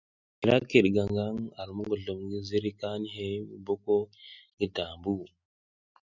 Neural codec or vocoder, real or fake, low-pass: none; real; 7.2 kHz